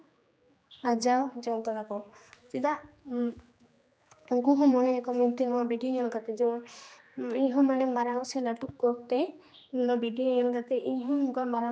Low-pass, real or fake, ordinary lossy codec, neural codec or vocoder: none; fake; none; codec, 16 kHz, 2 kbps, X-Codec, HuBERT features, trained on general audio